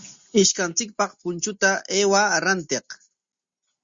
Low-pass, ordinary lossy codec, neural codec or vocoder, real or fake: 7.2 kHz; Opus, 64 kbps; none; real